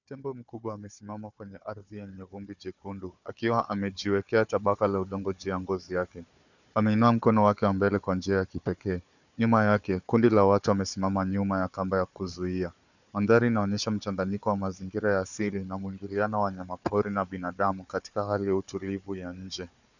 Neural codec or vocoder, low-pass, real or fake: codec, 16 kHz, 4 kbps, FunCodec, trained on Chinese and English, 50 frames a second; 7.2 kHz; fake